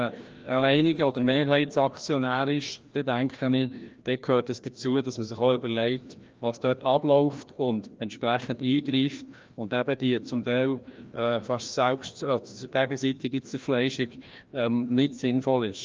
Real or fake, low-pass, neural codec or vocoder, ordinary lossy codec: fake; 7.2 kHz; codec, 16 kHz, 1 kbps, FreqCodec, larger model; Opus, 24 kbps